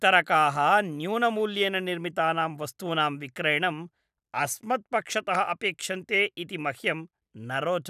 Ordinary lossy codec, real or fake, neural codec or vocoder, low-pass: none; real; none; 14.4 kHz